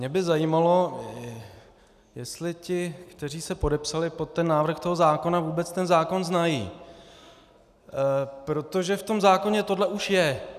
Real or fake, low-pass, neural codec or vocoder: real; 14.4 kHz; none